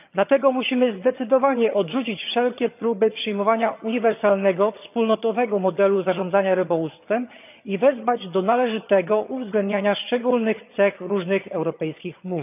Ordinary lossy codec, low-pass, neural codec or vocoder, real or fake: none; 3.6 kHz; vocoder, 22.05 kHz, 80 mel bands, HiFi-GAN; fake